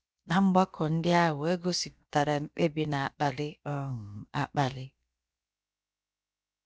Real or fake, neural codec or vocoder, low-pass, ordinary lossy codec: fake; codec, 16 kHz, about 1 kbps, DyCAST, with the encoder's durations; none; none